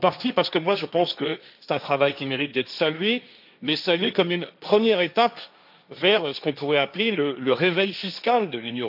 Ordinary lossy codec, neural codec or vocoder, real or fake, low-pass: none; codec, 16 kHz, 1.1 kbps, Voila-Tokenizer; fake; 5.4 kHz